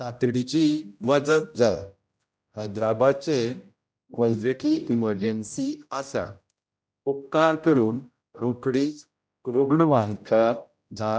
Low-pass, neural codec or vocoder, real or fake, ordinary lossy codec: none; codec, 16 kHz, 0.5 kbps, X-Codec, HuBERT features, trained on general audio; fake; none